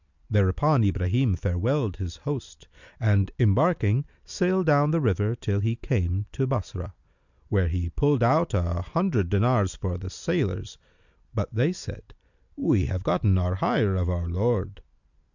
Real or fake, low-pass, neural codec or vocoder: real; 7.2 kHz; none